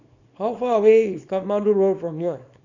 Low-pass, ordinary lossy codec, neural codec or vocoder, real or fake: 7.2 kHz; none; codec, 24 kHz, 0.9 kbps, WavTokenizer, small release; fake